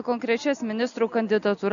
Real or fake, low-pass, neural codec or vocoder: real; 7.2 kHz; none